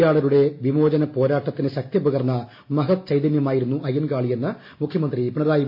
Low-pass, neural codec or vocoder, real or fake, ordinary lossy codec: 5.4 kHz; none; real; MP3, 32 kbps